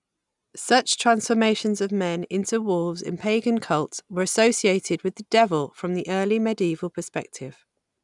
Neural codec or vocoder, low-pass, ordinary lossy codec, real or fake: none; 10.8 kHz; none; real